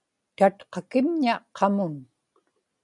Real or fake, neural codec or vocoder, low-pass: real; none; 10.8 kHz